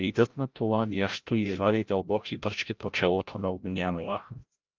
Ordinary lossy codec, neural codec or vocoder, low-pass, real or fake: Opus, 32 kbps; codec, 16 kHz, 0.5 kbps, FreqCodec, larger model; 7.2 kHz; fake